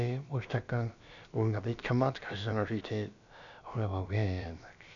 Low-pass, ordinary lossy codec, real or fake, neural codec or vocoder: 7.2 kHz; none; fake; codec, 16 kHz, about 1 kbps, DyCAST, with the encoder's durations